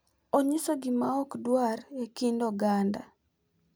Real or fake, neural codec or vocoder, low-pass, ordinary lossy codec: real; none; none; none